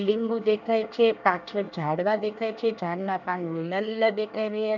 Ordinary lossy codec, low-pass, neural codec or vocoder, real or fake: none; 7.2 kHz; codec, 24 kHz, 1 kbps, SNAC; fake